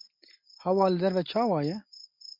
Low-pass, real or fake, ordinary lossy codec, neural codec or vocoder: 5.4 kHz; real; MP3, 48 kbps; none